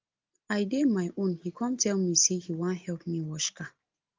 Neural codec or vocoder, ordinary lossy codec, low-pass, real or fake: none; Opus, 24 kbps; 7.2 kHz; real